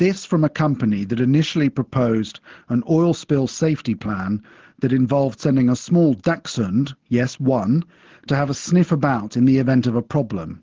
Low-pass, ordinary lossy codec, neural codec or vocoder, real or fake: 7.2 kHz; Opus, 16 kbps; none; real